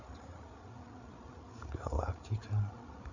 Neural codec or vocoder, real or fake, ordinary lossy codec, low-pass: codec, 16 kHz, 8 kbps, FreqCodec, larger model; fake; none; 7.2 kHz